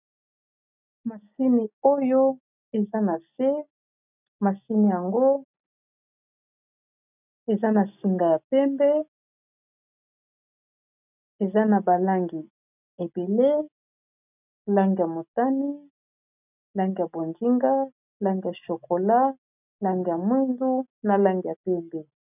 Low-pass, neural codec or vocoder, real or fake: 3.6 kHz; none; real